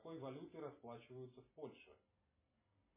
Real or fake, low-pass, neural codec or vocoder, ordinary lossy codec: real; 3.6 kHz; none; MP3, 16 kbps